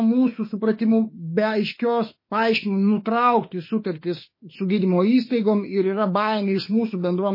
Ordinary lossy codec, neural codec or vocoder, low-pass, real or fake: MP3, 24 kbps; autoencoder, 48 kHz, 32 numbers a frame, DAC-VAE, trained on Japanese speech; 5.4 kHz; fake